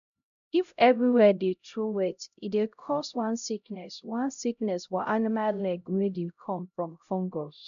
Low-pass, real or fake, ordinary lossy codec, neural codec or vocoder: 7.2 kHz; fake; none; codec, 16 kHz, 0.5 kbps, X-Codec, HuBERT features, trained on LibriSpeech